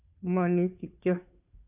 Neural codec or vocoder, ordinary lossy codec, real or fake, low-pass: codec, 16 kHz, 2 kbps, FunCodec, trained on Chinese and English, 25 frames a second; none; fake; 3.6 kHz